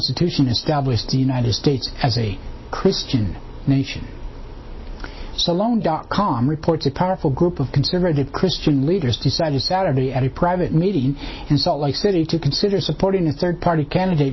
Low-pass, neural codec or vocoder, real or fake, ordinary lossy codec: 7.2 kHz; none; real; MP3, 24 kbps